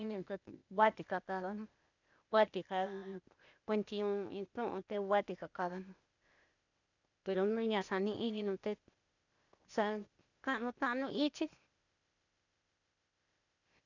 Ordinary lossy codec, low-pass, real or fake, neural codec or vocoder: none; 7.2 kHz; fake; codec, 16 kHz, 0.8 kbps, ZipCodec